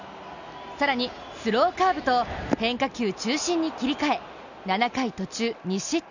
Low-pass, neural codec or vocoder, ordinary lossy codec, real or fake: 7.2 kHz; none; none; real